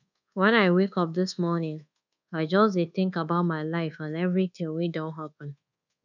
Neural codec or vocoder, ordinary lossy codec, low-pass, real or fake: codec, 24 kHz, 1.2 kbps, DualCodec; none; 7.2 kHz; fake